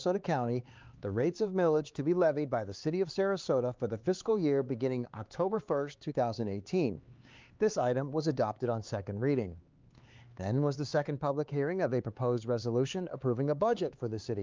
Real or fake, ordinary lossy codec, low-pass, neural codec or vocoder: fake; Opus, 24 kbps; 7.2 kHz; codec, 16 kHz, 4 kbps, X-Codec, HuBERT features, trained on LibriSpeech